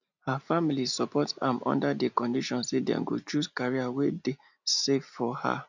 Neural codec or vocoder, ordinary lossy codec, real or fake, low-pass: none; none; real; 7.2 kHz